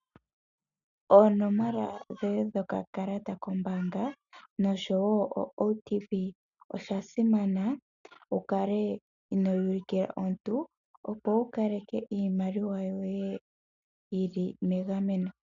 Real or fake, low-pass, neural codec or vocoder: real; 7.2 kHz; none